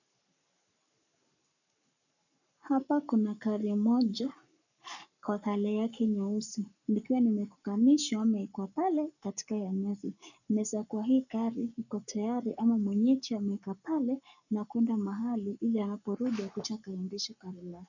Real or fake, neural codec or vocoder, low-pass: fake; codec, 44.1 kHz, 7.8 kbps, Pupu-Codec; 7.2 kHz